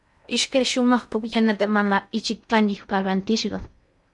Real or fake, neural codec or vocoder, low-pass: fake; codec, 16 kHz in and 24 kHz out, 0.6 kbps, FocalCodec, streaming, 2048 codes; 10.8 kHz